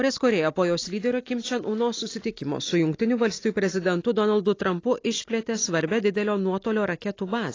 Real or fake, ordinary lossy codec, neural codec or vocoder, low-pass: real; AAC, 32 kbps; none; 7.2 kHz